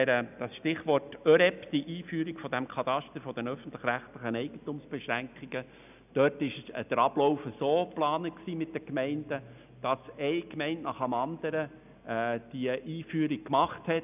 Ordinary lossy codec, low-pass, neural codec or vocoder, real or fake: none; 3.6 kHz; none; real